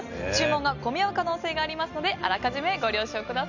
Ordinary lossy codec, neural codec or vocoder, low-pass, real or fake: none; none; 7.2 kHz; real